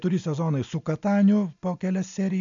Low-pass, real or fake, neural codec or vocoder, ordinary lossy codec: 7.2 kHz; real; none; MP3, 64 kbps